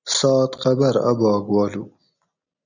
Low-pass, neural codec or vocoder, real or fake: 7.2 kHz; none; real